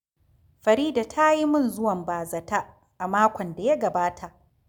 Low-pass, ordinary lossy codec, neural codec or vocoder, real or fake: 19.8 kHz; none; none; real